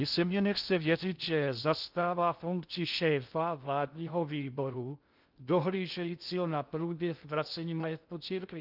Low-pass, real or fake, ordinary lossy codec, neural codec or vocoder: 5.4 kHz; fake; Opus, 24 kbps; codec, 16 kHz in and 24 kHz out, 0.6 kbps, FocalCodec, streaming, 2048 codes